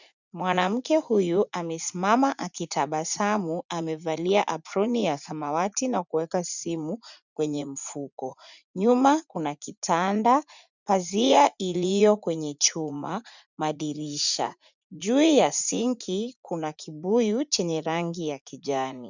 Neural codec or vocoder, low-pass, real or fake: vocoder, 44.1 kHz, 80 mel bands, Vocos; 7.2 kHz; fake